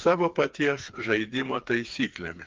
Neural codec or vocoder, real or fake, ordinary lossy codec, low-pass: codec, 16 kHz, 4 kbps, FreqCodec, smaller model; fake; Opus, 24 kbps; 7.2 kHz